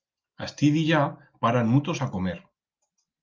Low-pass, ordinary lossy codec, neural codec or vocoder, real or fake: 7.2 kHz; Opus, 24 kbps; none; real